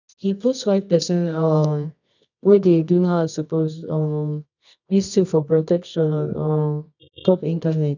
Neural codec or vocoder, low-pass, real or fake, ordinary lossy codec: codec, 24 kHz, 0.9 kbps, WavTokenizer, medium music audio release; 7.2 kHz; fake; none